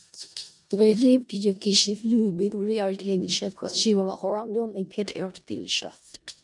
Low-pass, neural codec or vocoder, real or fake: 10.8 kHz; codec, 16 kHz in and 24 kHz out, 0.4 kbps, LongCat-Audio-Codec, four codebook decoder; fake